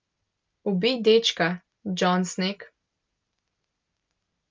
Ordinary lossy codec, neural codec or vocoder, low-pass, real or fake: Opus, 32 kbps; none; 7.2 kHz; real